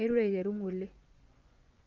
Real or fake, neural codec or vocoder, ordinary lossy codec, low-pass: fake; vocoder, 24 kHz, 100 mel bands, Vocos; Opus, 24 kbps; 7.2 kHz